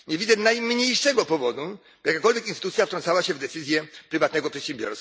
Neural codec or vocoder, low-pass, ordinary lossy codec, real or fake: none; none; none; real